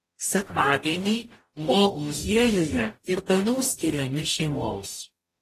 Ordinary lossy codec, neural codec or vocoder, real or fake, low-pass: AAC, 48 kbps; codec, 44.1 kHz, 0.9 kbps, DAC; fake; 14.4 kHz